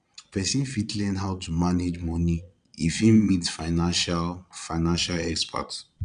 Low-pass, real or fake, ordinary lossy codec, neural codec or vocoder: 9.9 kHz; fake; none; vocoder, 22.05 kHz, 80 mel bands, Vocos